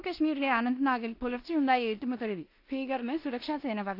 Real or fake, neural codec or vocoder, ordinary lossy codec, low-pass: fake; codec, 16 kHz in and 24 kHz out, 0.9 kbps, LongCat-Audio-Codec, four codebook decoder; AAC, 32 kbps; 5.4 kHz